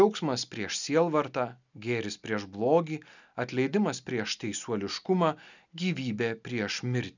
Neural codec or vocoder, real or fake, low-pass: none; real; 7.2 kHz